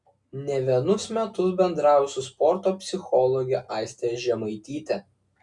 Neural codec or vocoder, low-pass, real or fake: none; 10.8 kHz; real